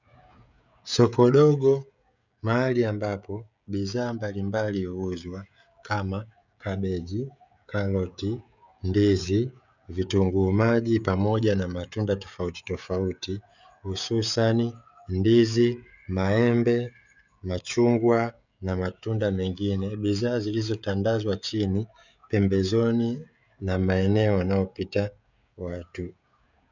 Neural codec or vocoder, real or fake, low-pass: codec, 16 kHz, 16 kbps, FreqCodec, smaller model; fake; 7.2 kHz